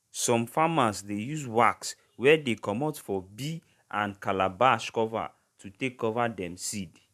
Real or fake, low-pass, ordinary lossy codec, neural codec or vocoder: fake; 14.4 kHz; none; vocoder, 44.1 kHz, 128 mel bands every 512 samples, BigVGAN v2